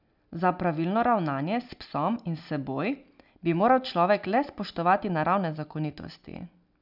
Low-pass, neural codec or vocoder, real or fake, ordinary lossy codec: 5.4 kHz; none; real; none